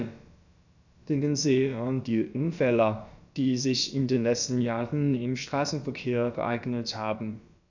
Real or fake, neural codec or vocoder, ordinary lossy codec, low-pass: fake; codec, 16 kHz, about 1 kbps, DyCAST, with the encoder's durations; none; 7.2 kHz